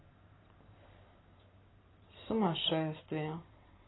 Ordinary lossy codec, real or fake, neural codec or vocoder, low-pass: AAC, 16 kbps; fake; vocoder, 44.1 kHz, 128 mel bands every 512 samples, BigVGAN v2; 7.2 kHz